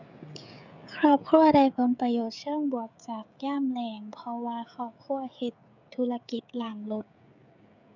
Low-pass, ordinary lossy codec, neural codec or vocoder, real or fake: 7.2 kHz; none; codec, 16 kHz, 16 kbps, FreqCodec, smaller model; fake